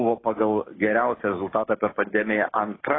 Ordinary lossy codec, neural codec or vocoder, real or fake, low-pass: AAC, 16 kbps; codec, 16 kHz, 6 kbps, DAC; fake; 7.2 kHz